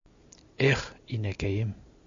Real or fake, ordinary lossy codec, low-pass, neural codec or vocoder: real; MP3, 64 kbps; 7.2 kHz; none